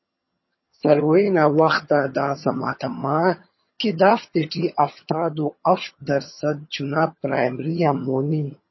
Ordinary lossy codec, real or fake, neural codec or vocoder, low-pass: MP3, 24 kbps; fake; vocoder, 22.05 kHz, 80 mel bands, HiFi-GAN; 7.2 kHz